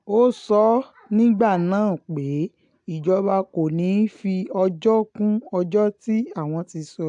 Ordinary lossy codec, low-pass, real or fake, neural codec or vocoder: AAC, 64 kbps; 10.8 kHz; real; none